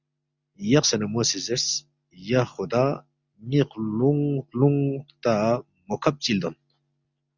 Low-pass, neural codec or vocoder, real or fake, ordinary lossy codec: 7.2 kHz; none; real; Opus, 64 kbps